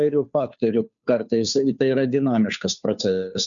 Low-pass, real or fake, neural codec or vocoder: 7.2 kHz; fake; codec, 16 kHz, 2 kbps, FunCodec, trained on Chinese and English, 25 frames a second